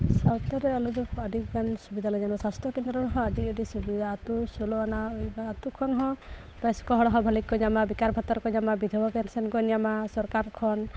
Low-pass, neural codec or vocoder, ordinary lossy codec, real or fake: none; codec, 16 kHz, 8 kbps, FunCodec, trained on Chinese and English, 25 frames a second; none; fake